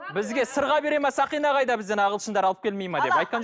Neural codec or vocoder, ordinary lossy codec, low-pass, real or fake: none; none; none; real